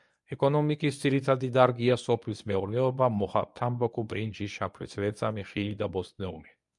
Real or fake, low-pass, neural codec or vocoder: fake; 10.8 kHz; codec, 24 kHz, 0.9 kbps, WavTokenizer, medium speech release version 1